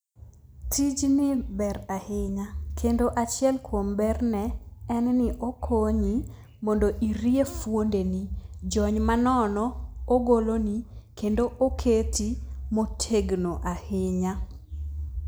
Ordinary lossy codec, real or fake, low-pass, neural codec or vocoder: none; real; none; none